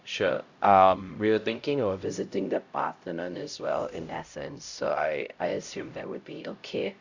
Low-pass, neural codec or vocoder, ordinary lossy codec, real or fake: 7.2 kHz; codec, 16 kHz, 0.5 kbps, X-Codec, HuBERT features, trained on LibriSpeech; Opus, 64 kbps; fake